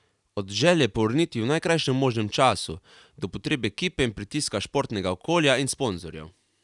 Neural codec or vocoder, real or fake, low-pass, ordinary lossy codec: none; real; 10.8 kHz; none